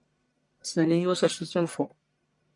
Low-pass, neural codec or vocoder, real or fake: 10.8 kHz; codec, 44.1 kHz, 1.7 kbps, Pupu-Codec; fake